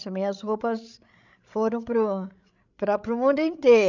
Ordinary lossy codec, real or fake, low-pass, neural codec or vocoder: none; fake; 7.2 kHz; codec, 16 kHz, 16 kbps, FreqCodec, larger model